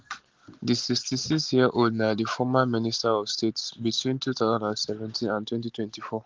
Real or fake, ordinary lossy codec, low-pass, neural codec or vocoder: real; Opus, 16 kbps; 7.2 kHz; none